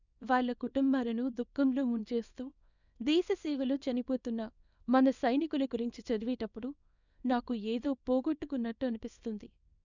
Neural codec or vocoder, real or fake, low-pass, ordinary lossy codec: codec, 24 kHz, 0.9 kbps, WavTokenizer, small release; fake; 7.2 kHz; none